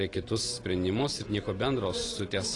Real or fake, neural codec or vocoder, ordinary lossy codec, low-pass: real; none; AAC, 32 kbps; 10.8 kHz